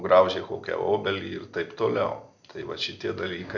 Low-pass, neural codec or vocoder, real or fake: 7.2 kHz; none; real